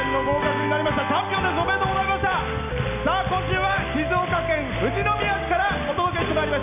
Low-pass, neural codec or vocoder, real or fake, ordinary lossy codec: 3.6 kHz; none; real; none